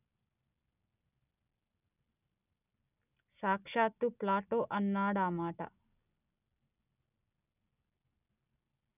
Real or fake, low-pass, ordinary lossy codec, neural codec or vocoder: real; 3.6 kHz; none; none